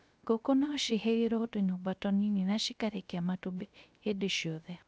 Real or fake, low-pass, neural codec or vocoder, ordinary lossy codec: fake; none; codec, 16 kHz, 0.3 kbps, FocalCodec; none